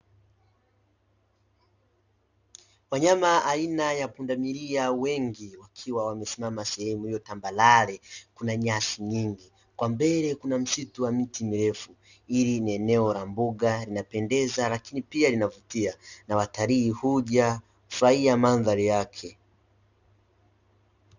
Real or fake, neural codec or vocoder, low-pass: real; none; 7.2 kHz